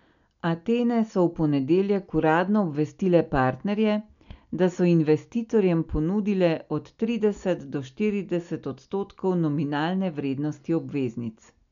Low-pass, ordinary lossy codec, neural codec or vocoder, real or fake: 7.2 kHz; none; none; real